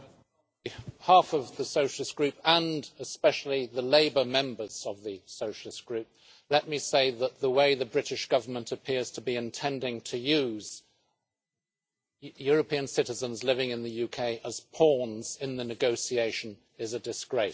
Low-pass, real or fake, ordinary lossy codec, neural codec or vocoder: none; real; none; none